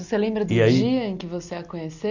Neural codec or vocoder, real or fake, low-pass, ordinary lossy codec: none; real; 7.2 kHz; none